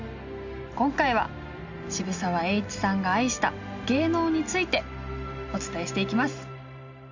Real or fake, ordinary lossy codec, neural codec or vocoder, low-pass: real; none; none; 7.2 kHz